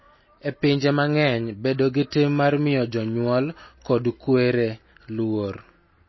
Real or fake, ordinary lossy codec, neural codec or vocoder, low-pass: real; MP3, 24 kbps; none; 7.2 kHz